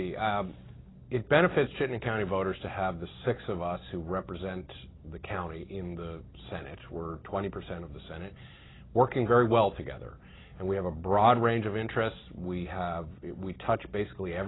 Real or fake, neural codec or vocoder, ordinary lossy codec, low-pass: real; none; AAC, 16 kbps; 7.2 kHz